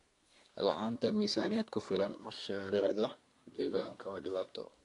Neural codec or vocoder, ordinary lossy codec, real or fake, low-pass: codec, 24 kHz, 1 kbps, SNAC; AAC, 48 kbps; fake; 10.8 kHz